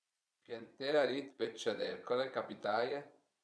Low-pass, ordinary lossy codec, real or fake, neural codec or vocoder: none; none; fake; vocoder, 22.05 kHz, 80 mel bands, Vocos